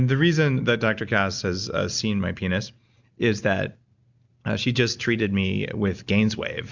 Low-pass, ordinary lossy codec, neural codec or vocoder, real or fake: 7.2 kHz; Opus, 64 kbps; none; real